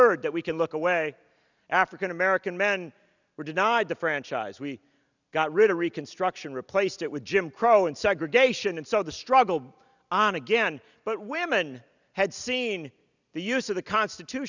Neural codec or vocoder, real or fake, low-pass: none; real; 7.2 kHz